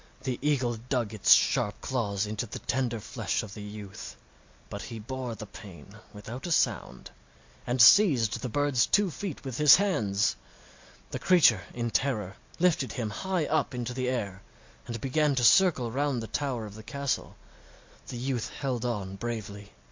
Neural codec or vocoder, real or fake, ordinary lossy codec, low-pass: none; real; MP3, 48 kbps; 7.2 kHz